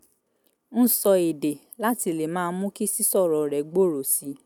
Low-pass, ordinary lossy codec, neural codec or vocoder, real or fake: 19.8 kHz; none; none; real